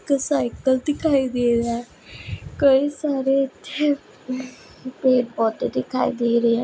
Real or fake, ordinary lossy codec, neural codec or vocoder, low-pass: real; none; none; none